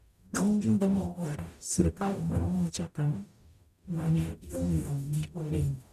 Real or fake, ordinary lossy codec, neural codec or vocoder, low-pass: fake; none; codec, 44.1 kHz, 0.9 kbps, DAC; 14.4 kHz